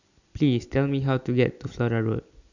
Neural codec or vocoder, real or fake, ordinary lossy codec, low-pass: none; real; none; 7.2 kHz